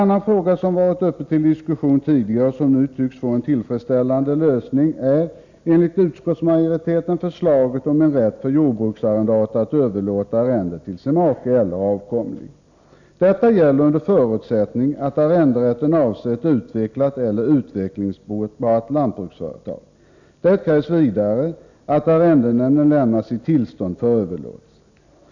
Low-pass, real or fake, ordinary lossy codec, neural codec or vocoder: 7.2 kHz; real; none; none